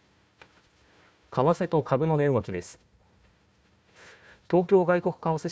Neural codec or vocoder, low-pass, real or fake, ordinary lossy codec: codec, 16 kHz, 1 kbps, FunCodec, trained on Chinese and English, 50 frames a second; none; fake; none